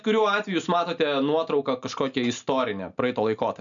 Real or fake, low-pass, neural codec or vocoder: real; 7.2 kHz; none